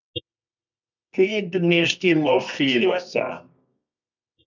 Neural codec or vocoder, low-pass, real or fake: codec, 24 kHz, 0.9 kbps, WavTokenizer, medium music audio release; 7.2 kHz; fake